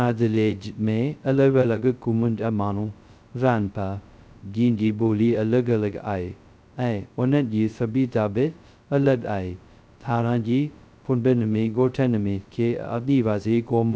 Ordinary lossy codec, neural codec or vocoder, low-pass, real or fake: none; codec, 16 kHz, 0.2 kbps, FocalCodec; none; fake